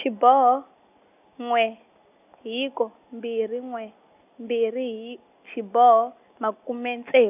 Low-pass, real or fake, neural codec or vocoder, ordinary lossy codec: 3.6 kHz; real; none; none